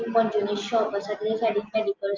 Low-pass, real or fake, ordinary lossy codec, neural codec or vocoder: 7.2 kHz; real; Opus, 32 kbps; none